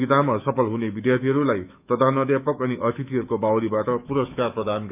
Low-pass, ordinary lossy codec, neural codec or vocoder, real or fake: 3.6 kHz; none; autoencoder, 48 kHz, 128 numbers a frame, DAC-VAE, trained on Japanese speech; fake